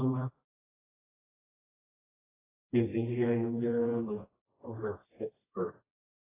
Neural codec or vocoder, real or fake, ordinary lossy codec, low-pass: codec, 16 kHz, 1 kbps, FreqCodec, smaller model; fake; AAC, 16 kbps; 3.6 kHz